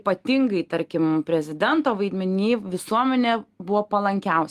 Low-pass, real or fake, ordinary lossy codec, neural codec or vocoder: 14.4 kHz; real; Opus, 24 kbps; none